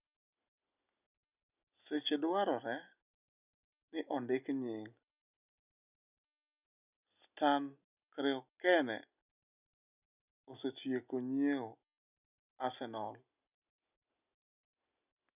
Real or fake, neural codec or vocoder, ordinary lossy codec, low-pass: real; none; none; 3.6 kHz